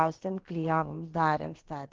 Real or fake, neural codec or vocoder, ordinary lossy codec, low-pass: fake; codec, 16 kHz, about 1 kbps, DyCAST, with the encoder's durations; Opus, 16 kbps; 7.2 kHz